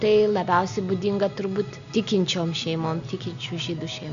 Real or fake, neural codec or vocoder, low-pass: real; none; 7.2 kHz